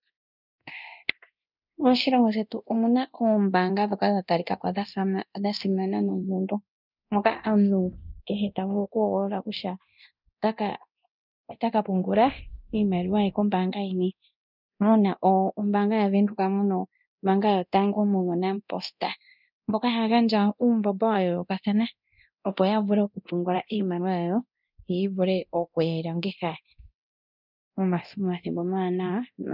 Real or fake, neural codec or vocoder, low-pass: fake; codec, 24 kHz, 0.9 kbps, DualCodec; 5.4 kHz